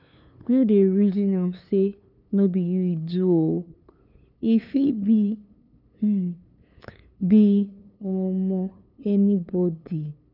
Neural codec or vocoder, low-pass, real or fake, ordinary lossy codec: codec, 16 kHz, 2 kbps, FunCodec, trained on LibriTTS, 25 frames a second; 5.4 kHz; fake; none